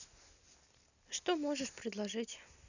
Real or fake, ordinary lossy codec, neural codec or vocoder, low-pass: real; none; none; 7.2 kHz